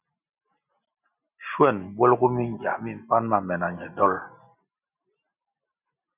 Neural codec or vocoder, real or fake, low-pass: none; real; 3.6 kHz